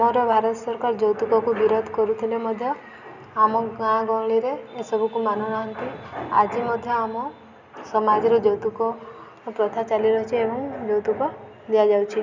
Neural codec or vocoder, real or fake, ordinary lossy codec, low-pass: none; real; none; 7.2 kHz